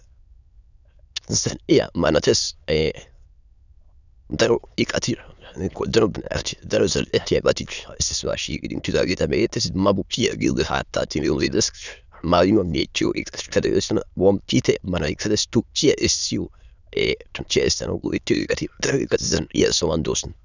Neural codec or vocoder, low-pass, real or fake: autoencoder, 22.05 kHz, a latent of 192 numbers a frame, VITS, trained on many speakers; 7.2 kHz; fake